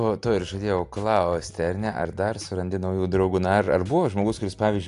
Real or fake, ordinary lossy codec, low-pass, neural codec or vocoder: real; AAC, 64 kbps; 10.8 kHz; none